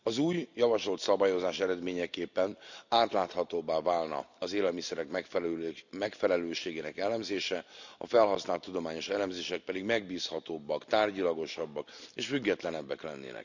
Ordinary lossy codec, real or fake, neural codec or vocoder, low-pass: none; real; none; 7.2 kHz